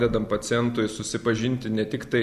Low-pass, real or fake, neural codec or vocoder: 14.4 kHz; real; none